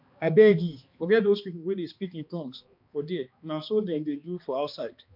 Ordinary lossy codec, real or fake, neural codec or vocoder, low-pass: MP3, 48 kbps; fake; codec, 16 kHz, 2 kbps, X-Codec, HuBERT features, trained on balanced general audio; 5.4 kHz